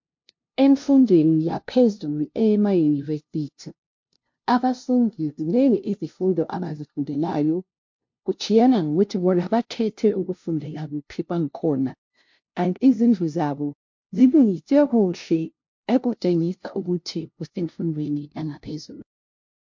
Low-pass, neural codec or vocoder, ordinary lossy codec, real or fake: 7.2 kHz; codec, 16 kHz, 0.5 kbps, FunCodec, trained on LibriTTS, 25 frames a second; MP3, 48 kbps; fake